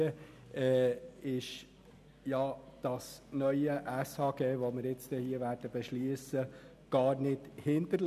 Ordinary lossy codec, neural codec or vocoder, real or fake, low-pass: none; none; real; 14.4 kHz